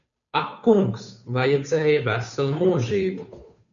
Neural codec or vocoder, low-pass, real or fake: codec, 16 kHz, 2 kbps, FunCodec, trained on Chinese and English, 25 frames a second; 7.2 kHz; fake